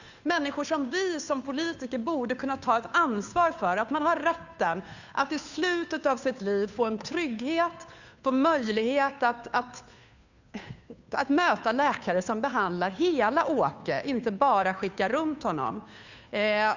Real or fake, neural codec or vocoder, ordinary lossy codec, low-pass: fake; codec, 16 kHz, 2 kbps, FunCodec, trained on Chinese and English, 25 frames a second; none; 7.2 kHz